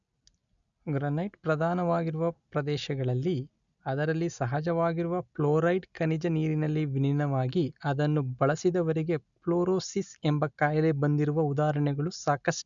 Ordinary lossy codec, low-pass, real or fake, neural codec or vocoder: none; 7.2 kHz; real; none